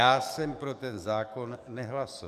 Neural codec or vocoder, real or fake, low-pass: codec, 44.1 kHz, 7.8 kbps, DAC; fake; 14.4 kHz